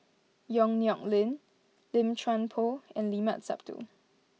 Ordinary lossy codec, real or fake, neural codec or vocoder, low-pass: none; real; none; none